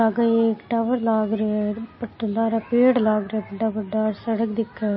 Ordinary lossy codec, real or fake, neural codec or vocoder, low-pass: MP3, 24 kbps; real; none; 7.2 kHz